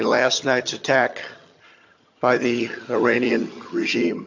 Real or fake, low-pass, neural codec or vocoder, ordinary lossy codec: fake; 7.2 kHz; vocoder, 22.05 kHz, 80 mel bands, HiFi-GAN; AAC, 48 kbps